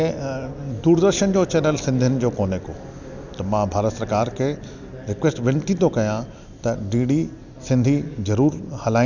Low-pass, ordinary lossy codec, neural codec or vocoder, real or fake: 7.2 kHz; none; none; real